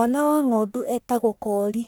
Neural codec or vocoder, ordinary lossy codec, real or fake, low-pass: codec, 44.1 kHz, 1.7 kbps, Pupu-Codec; none; fake; none